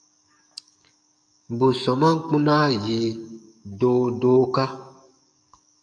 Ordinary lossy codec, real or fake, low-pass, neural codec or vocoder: MP3, 96 kbps; fake; 9.9 kHz; codec, 44.1 kHz, 7.8 kbps, DAC